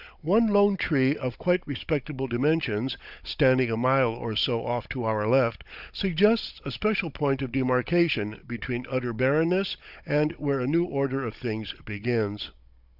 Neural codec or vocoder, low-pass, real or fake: codec, 16 kHz, 16 kbps, FunCodec, trained on Chinese and English, 50 frames a second; 5.4 kHz; fake